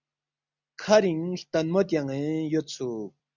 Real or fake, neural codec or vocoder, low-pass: real; none; 7.2 kHz